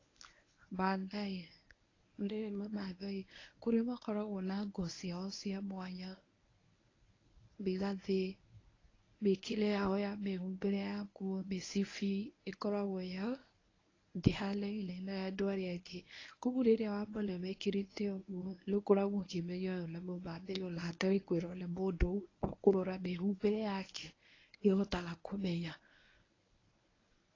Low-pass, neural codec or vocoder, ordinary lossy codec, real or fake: 7.2 kHz; codec, 24 kHz, 0.9 kbps, WavTokenizer, medium speech release version 1; AAC, 32 kbps; fake